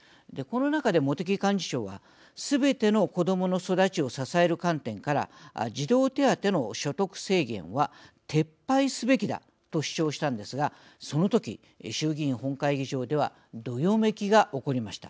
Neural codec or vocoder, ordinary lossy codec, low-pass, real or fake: none; none; none; real